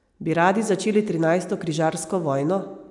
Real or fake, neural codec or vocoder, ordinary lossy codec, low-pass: real; none; none; 10.8 kHz